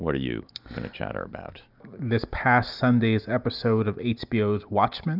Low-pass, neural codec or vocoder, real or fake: 5.4 kHz; none; real